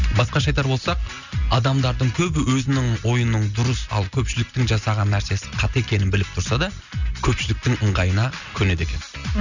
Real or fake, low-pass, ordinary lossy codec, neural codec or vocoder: real; 7.2 kHz; none; none